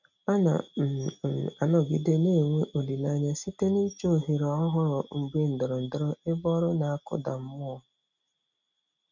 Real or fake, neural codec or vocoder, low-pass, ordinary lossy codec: real; none; 7.2 kHz; none